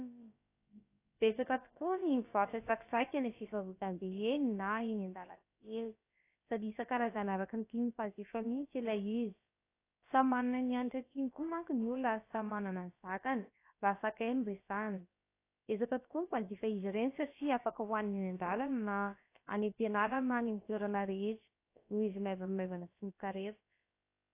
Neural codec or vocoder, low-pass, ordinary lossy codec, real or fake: codec, 16 kHz, about 1 kbps, DyCAST, with the encoder's durations; 3.6 kHz; AAC, 24 kbps; fake